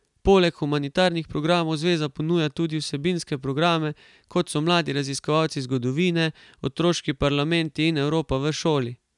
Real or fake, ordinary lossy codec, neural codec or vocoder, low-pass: real; none; none; 10.8 kHz